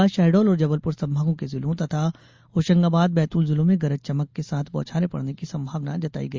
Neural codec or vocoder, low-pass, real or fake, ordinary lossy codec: none; 7.2 kHz; real; Opus, 32 kbps